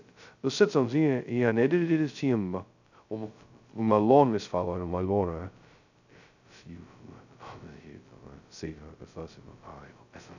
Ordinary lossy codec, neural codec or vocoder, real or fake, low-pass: none; codec, 16 kHz, 0.2 kbps, FocalCodec; fake; 7.2 kHz